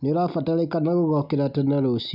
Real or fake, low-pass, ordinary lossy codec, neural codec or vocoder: real; 5.4 kHz; none; none